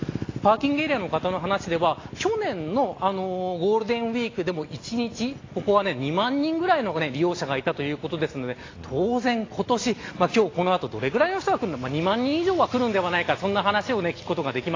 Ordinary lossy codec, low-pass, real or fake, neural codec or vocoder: AAC, 32 kbps; 7.2 kHz; real; none